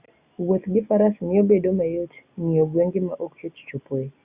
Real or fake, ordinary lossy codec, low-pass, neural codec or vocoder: real; Opus, 64 kbps; 3.6 kHz; none